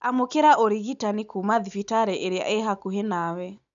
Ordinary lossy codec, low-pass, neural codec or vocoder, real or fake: none; 7.2 kHz; none; real